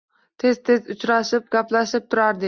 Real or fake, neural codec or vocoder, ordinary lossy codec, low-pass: real; none; Opus, 64 kbps; 7.2 kHz